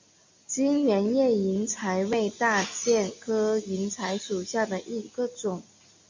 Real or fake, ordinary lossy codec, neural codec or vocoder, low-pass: real; MP3, 48 kbps; none; 7.2 kHz